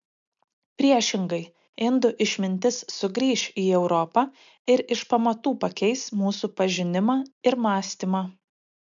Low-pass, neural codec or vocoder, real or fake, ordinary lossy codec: 7.2 kHz; none; real; MP3, 64 kbps